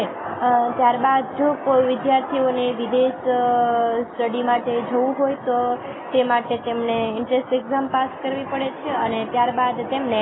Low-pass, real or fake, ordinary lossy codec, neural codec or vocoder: 7.2 kHz; real; AAC, 16 kbps; none